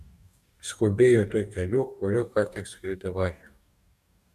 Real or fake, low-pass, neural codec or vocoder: fake; 14.4 kHz; codec, 44.1 kHz, 2.6 kbps, DAC